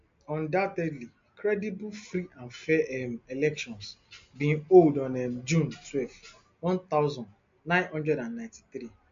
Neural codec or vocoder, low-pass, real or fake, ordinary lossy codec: none; 7.2 kHz; real; MP3, 48 kbps